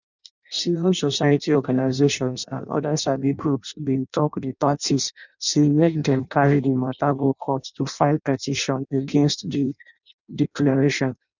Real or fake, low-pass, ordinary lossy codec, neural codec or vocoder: fake; 7.2 kHz; none; codec, 16 kHz in and 24 kHz out, 0.6 kbps, FireRedTTS-2 codec